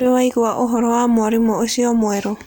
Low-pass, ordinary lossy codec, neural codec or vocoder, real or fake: none; none; none; real